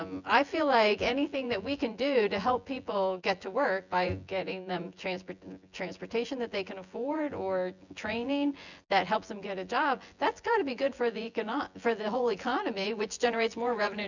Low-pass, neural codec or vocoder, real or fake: 7.2 kHz; vocoder, 24 kHz, 100 mel bands, Vocos; fake